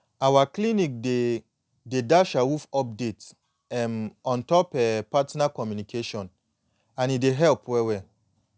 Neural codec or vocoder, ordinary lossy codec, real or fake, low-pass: none; none; real; none